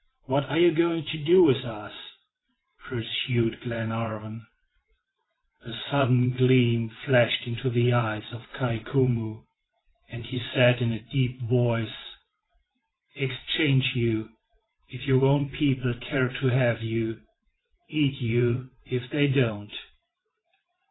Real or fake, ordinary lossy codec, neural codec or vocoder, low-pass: fake; AAC, 16 kbps; vocoder, 22.05 kHz, 80 mel bands, WaveNeXt; 7.2 kHz